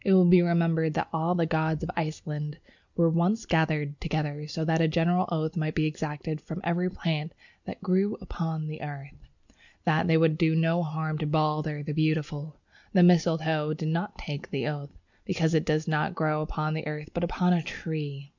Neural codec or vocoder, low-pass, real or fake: none; 7.2 kHz; real